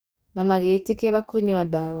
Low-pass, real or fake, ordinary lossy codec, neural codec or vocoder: none; fake; none; codec, 44.1 kHz, 2.6 kbps, DAC